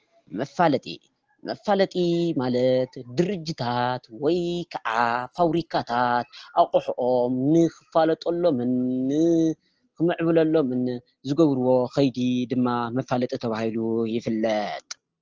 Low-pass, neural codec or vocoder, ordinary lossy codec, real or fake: 7.2 kHz; none; Opus, 16 kbps; real